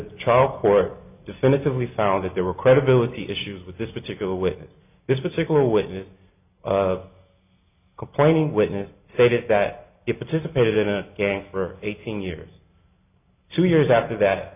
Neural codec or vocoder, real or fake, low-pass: none; real; 3.6 kHz